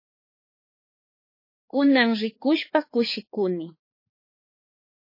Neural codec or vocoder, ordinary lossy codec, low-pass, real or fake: codec, 16 kHz, 4 kbps, X-Codec, HuBERT features, trained on balanced general audio; MP3, 24 kbps; 5.4 kHz; fake